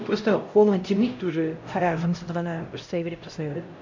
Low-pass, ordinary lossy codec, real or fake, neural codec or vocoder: 7.2 kHz; MP3, 48 kbps; fake; codec, 16 kHz, 0.5 kbps, X-Codec, HuBERT features, trained on LibriSpeech